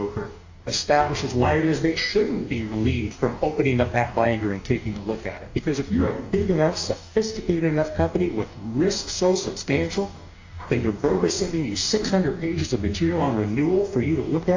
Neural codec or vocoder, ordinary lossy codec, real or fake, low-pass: codec, 44.1 kHz, 2.6 kbps, DAC; AAC, 48 kbps; fake; 7.2 kHz